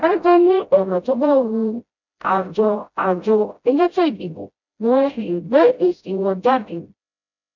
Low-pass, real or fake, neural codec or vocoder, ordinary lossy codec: 7.2 kHz; fake; codec, 16 kHz, 0.5 kbps, FreqCodec, smaller model; none